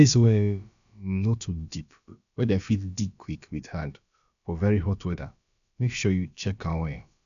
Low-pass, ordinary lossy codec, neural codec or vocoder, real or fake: 7.2 kHz; none; codec, 16 kHz, about 1 kbps, DyCAST, with the encoder's durations; fake